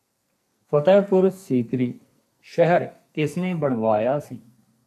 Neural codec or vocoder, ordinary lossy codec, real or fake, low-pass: codec, 44.1 kHz, 2.6 kbps, SNAC; MP3, 96 kbps; fake; 14.4 kHz